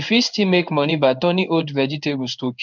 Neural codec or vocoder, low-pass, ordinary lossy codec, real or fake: codec, 16 kHz in and 24 kHz out, 1 kbps, XY-Tokenizer; 7.2 kHz; none; fake